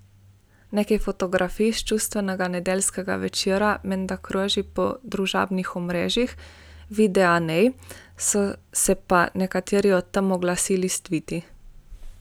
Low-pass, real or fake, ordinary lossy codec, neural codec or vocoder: none; real; none; none